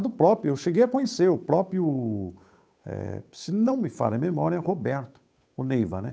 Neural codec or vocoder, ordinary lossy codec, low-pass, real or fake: codec, 16 kHz, 8 kbps, FunCodec, trained on Chinese and English, 25 frames a second; none; none; fake